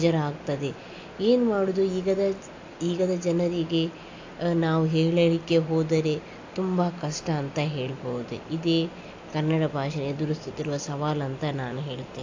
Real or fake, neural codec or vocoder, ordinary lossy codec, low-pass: real; none; AAC, 48 kbps; 7.2 kHz